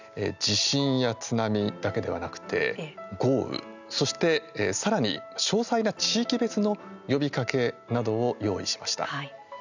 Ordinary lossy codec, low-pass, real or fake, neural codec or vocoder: none; 7.2 kHz; real; none